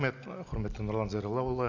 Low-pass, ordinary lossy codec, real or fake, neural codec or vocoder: 7.2 kHz; none; real; none